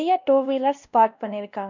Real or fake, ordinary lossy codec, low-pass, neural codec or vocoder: fake; none; 7.2 kHz; codec, 16 kHz, 1 kbps, X-Codec, WavLM features, trained on Multilingual LibriSpeech